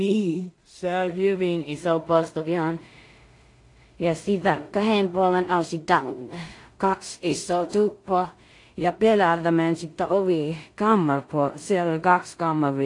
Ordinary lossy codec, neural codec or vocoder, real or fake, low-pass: AAC, 48 kbps; codec, 16 kHz in and 24 kHz out, 0.4 kbps, LongCat-Audio-Codec, two codebook decoder; fake; 10.8 kHz